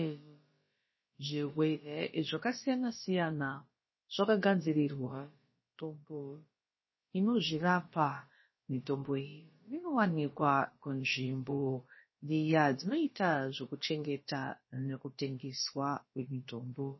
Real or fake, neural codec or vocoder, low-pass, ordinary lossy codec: fake; codec, 16 kHz, about 1 kbps, DyCAST, with the encoder's durations; 7.2 kHz; MP3, 24 kbps